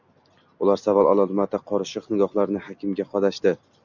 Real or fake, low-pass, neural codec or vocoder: real; 7.2 kHz; none